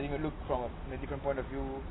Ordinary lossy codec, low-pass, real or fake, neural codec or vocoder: AAC, 16 kbps; 7.2 kHz; real; none